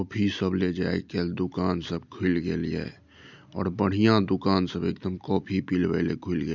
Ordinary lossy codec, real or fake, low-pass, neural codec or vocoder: none; real; 7.2 kHz; none